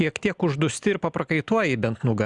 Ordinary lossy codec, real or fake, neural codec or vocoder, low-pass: Opus, 64 kbps; real; none; 10.8 kHz